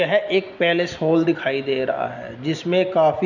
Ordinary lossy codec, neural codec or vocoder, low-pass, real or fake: none; none; 7.2 kHz; real